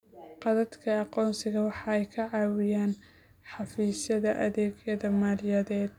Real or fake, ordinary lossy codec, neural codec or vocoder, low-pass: real; none; none; 19.8 kHz